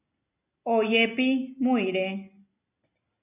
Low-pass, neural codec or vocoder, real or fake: 3.6 kHz; none; real